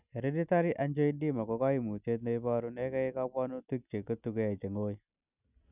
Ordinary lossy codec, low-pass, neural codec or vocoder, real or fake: none; 3.6 kHz; none; real